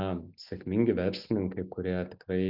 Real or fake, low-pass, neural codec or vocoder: real; 5.4 kHz; none